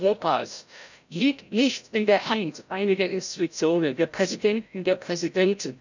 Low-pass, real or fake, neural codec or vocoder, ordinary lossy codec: 7.2 kHz; fake; codec, 16 kHz, 0.5 kbps, FreqCodec, larger model; none